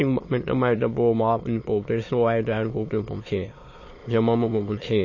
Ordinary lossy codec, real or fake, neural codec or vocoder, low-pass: MP3, 32 kbps; fake; autoencoder, 22.05 kHz, a latent of 192 numbers a frame, VITS, trained on many speakers; 7.2 kHz